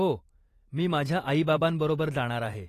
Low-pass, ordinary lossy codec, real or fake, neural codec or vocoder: 14.4 kHz; AAC, 48 kbps; fake; vocoder, 44.1 kHz, 128 mel bands every 512 samples, BigVGAN v2